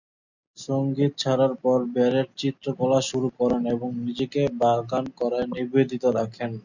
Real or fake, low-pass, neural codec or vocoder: real; 7.2 kHz; none